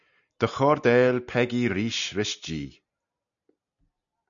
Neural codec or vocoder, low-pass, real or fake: none; 7.2 kHz; real